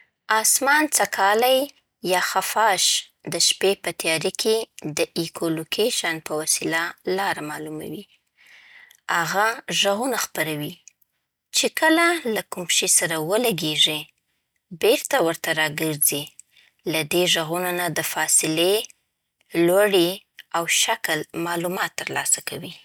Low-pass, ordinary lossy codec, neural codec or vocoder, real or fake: none; none; none; real